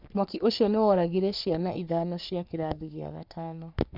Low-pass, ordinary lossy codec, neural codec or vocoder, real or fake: 5.4 kHz; none; codec, 44.1 kHz, 3.4 kbps, Pupu-Codec; fake